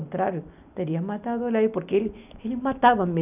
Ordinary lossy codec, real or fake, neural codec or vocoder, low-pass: none; real; none; 3.6 kHz